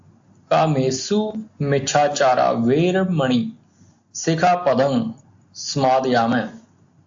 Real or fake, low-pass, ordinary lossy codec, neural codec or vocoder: real; 7.2 kHz; AAC, 64 kbps; none